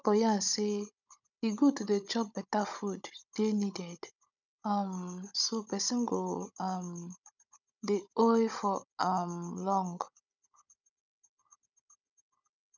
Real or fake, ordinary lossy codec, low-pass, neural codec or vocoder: fake; none; 7.2 kHz; codec, 16 kHz, 16 kbps, FunCodec, trained on Chinese and English, 50 frames a second